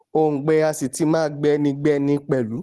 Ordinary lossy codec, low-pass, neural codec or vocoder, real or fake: Opus, 16 kbps; 10.8 kHz; none; real